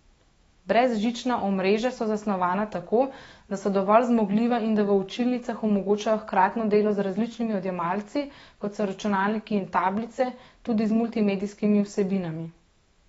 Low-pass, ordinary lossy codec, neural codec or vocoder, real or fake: 19.8 kHz; AAC, 24 kbps; autoencoder, 48 kHz, 128 numbers a frame, DAC-VAE, trained on Japanese speech; fake